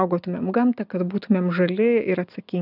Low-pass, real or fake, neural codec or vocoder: 5.4 kHz; real; none